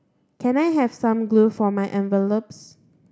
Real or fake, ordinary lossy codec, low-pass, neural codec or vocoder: real; none; none; none